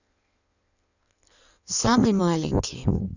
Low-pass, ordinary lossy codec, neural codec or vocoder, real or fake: 7.2 kHz; none; codec, 16 kHz in and 24 kHz out, 1.1 kbps, FireRedTTS-2 codec; fake